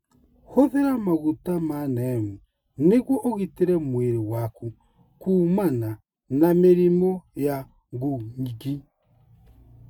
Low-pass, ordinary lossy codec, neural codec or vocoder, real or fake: 19.8 kHz; none; none; real